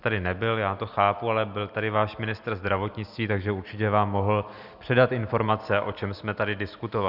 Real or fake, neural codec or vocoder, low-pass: real; none; 5.4 kHz